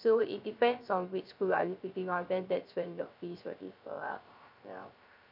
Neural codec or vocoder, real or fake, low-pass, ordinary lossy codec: codec, 16 kHz, 0.3 kbps, FocalCodec; fake; 5.4 kHz; none